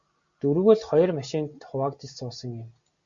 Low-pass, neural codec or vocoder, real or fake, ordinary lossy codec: 7.2 kHz; none; real; Opus, 64 kbps